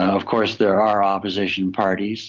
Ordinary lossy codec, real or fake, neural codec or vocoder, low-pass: Opus, 32 kbps; real; none; 7.2 kHz